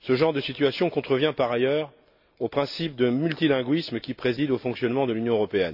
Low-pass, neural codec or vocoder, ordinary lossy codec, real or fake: 5.4 kHz; none; AAC, 48 kbps; real